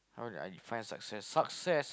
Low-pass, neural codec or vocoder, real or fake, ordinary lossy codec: none; none; real; none